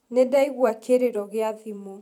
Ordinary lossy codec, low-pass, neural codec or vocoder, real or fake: none; 19.8 kHz; vocoder, 44.1 kHz, 128 mel bands every 512 samples, BigVGAN v2; fake